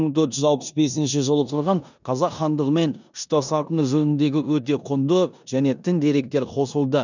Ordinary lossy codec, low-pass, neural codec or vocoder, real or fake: none; 7.2 kHz; codec, 16 kHz in and 24 kHz out, 0.9 kbps, LongCat-Audio-Codec, four codebook decoder; fake